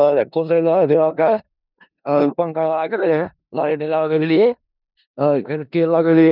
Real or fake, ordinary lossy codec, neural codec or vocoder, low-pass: fake; none; codec, 16 kHz in and 24 kHz out, 0.4 kbps, LongCat-Audio-Codec, four codebook decoder; 5.4 kHz